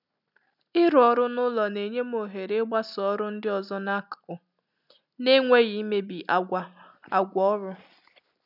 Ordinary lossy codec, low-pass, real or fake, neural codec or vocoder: none; 5.4 kHz; real; none